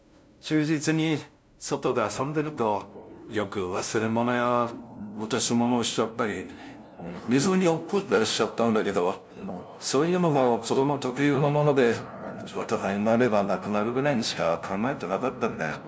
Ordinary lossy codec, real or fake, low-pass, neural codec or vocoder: none; fake; none; codec, 16 kHz, 0.5 kbps, FunCodec, trained on LibriTTS, 25 frames a second